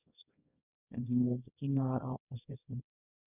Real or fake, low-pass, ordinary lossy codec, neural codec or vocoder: fake; 3.6 kHz; none; codec, 24 kHz, 0.9 kbps, WavTokenizer, small release